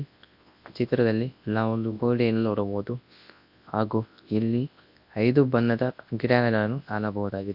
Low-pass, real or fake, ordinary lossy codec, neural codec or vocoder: 5.4 kHz; fake; AAC, 48 kbps; codec, 24 kHz, 0.9 kbps, WavTokenizer, large speech release